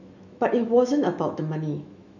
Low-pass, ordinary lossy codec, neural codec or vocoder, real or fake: 7.2 kHz; none; autoencoder, 48 kHz, 128 numbers a frame, DAC-VAE, trained on Japanese speech; fake